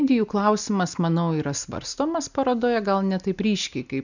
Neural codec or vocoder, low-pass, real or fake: none; 7.2 kHz; real